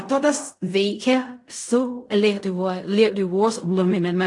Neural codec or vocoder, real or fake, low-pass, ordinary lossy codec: codec, 16 kHz in and 24 kHz out, 0.4 kbps, LongCat-Audio-Codec, fine tuned four codebook decoder; fake; 10.8 kHz; AAC, 48 kbps